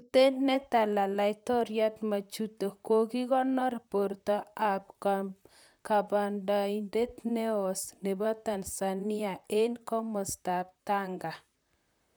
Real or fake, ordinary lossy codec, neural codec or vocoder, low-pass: fake; none; vocoder, 44.1 kHz, 128 mel bands, Pupu-Vocoder; none